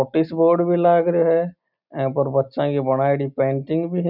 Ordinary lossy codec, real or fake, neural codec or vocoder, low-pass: none; real; none; 5.4 kHz